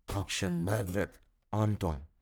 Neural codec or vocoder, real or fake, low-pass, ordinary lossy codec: codec, 44.1 kHz, 1.7 kbps, Pupu-Codec; fake; none; none